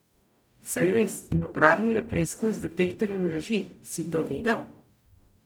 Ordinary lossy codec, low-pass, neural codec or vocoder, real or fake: none; none; codec, 44.1 kHz, 0.9 kbps, DAC; fake